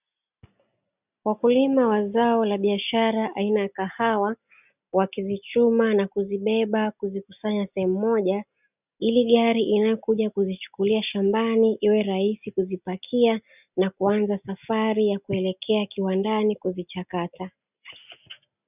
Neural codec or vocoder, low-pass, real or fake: none; 3.6 kHz; real